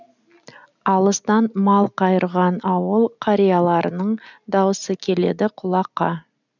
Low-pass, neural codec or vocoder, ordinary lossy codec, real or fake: 7.2 kHz; none; none; real